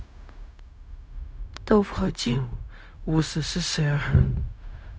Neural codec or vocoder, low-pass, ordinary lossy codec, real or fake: codec, 16 kHz, 0.4 kbps, LongCat-Audio-Codec; none; none; fake